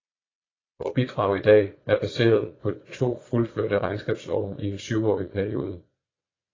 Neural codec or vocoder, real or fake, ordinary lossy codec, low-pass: vocoder, 22.05 kHz, 80 mel bands, Vocos; fake; AAC, 32 kbps; 7.2 kHz